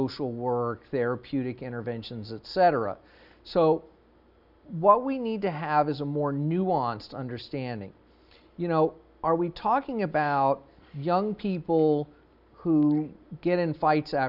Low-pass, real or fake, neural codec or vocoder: 5.4 kHz; real; none